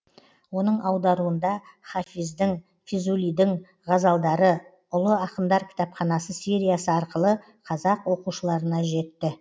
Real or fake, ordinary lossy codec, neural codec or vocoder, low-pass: real; none; none; none